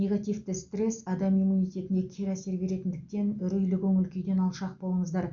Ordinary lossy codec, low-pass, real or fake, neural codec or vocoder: none; 7.2 kHz; real; none